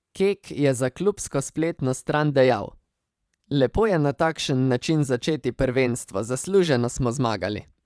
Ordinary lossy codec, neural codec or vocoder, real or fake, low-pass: none; vocoder, 22.05 kHz, 80 mel bands, Vocos; fake; none